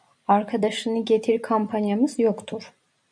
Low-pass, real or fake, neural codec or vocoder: 9.9 kHz; fake; vocoder, 44.1 kHz, 128 mel bands every 256 samples, BigVGAN v2